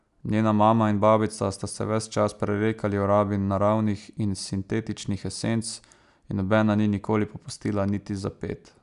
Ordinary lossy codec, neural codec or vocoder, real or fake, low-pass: none; none; real; 10.8 kHz